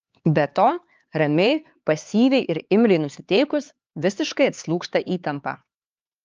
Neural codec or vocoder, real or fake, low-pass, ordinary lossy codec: codec, 16 kHz, 4 kbps, X-Codec, HuBERT features, trained on LibriSpeech; fake; 7.2 kHz; Opus, 24 kbps